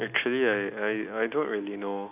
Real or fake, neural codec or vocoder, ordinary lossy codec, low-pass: real; none; none; 3.6 kHz